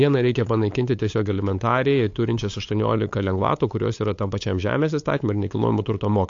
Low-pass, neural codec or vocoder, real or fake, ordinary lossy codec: 7.2 kHz; codec, 16 kHz, 8 kbps, FunCodec, trained on LibriTTS, 25 frames a second; fake; AAC, 48 kbps